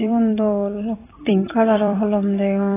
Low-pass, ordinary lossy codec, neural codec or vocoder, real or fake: 3.6 kHz; AAC, 16 kbps; vocoder, 44.1 kHz, 128 mel bands every 256 samples, BigVGAN v2; fake